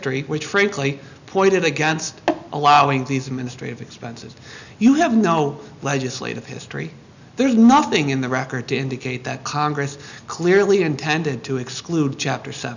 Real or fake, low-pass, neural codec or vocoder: real; 7.2 kHz; none